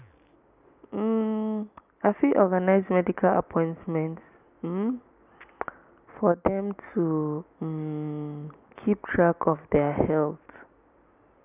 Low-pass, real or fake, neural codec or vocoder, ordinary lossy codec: 3.6 kHz; real; none; none